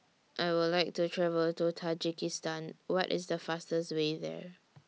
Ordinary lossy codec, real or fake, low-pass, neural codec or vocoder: none; real; none; none